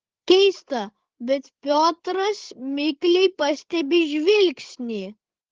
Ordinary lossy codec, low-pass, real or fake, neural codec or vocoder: Opus, 16 kbps; 7.2 kHz; fake; codec, 16 kHz, 8 kbps, FreqCodec, larger model